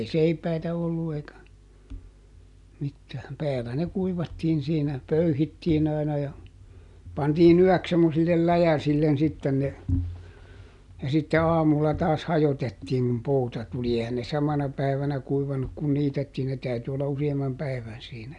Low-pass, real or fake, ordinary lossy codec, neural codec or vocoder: 10.8 kHz; real; none; none